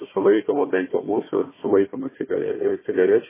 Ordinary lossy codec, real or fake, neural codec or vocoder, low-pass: MP3, 16 kbps; fake; codec, 16 kHz, 1 kbps, FunCodec, trained on Chinese and English, 50 frames a second; 3.6 kHz